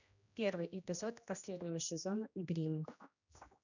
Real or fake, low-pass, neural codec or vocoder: fake; 7.2 kHz; codec, 16 kHz, 1 kbps, X-Codec, HuBERT features, trained on general audio